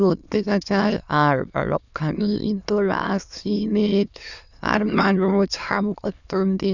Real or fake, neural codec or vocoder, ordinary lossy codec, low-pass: fake; autoencoder, 22.05 kHz, a latent of 192 numbers a frame, VITS, trained on many speakers; none; 7.2 kHz